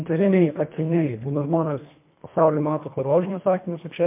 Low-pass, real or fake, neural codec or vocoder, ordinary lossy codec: 3.6 kHz; fake; codec, 24 kHz, 1.5 kbps, HILCodec; MP3, 32 kbps